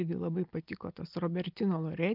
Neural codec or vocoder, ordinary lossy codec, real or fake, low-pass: none; Opus, 24 kbps; real; 5.4 kHz